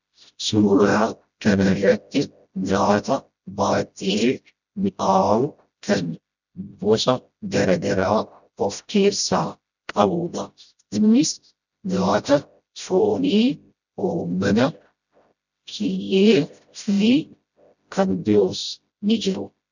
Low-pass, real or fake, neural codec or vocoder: 7.2 kHz; fake; codec, 16 kHz, 0.5 kbps, FreqCodec, smaller model